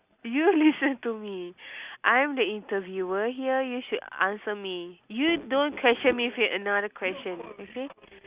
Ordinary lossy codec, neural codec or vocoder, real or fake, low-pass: Opus, 64 kbps; none; real; 3.6 kHz